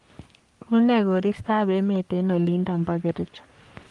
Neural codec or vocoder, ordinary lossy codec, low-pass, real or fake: codec, 44.1 kHz, 3.4 kbps, Pupu-Codec; Opus, 24 kbps; 10.8 kHz; fake